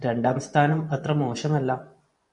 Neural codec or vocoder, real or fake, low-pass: vocoder, 24 kHz, 100 mel bands, Vocos; fake; 10.8 kHz